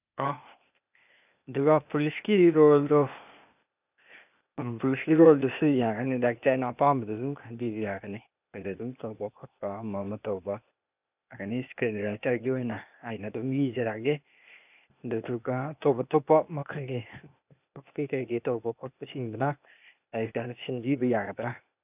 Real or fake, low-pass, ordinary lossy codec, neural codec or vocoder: fake; 3.6 kHz; none; codec, 16 kHz, 0.8 kbps, ZipCodec